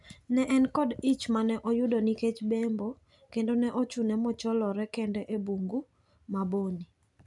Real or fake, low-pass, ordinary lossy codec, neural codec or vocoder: real; 10.8 kHz; none; none